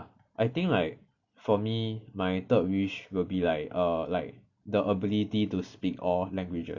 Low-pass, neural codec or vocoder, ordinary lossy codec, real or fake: 7.2 kHz; none; none; real